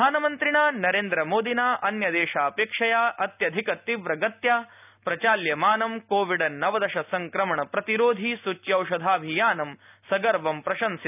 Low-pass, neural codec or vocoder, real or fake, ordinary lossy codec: 3.6 kHz; none; real; none